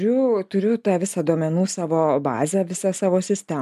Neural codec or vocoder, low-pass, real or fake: none; 14.4 kHz; real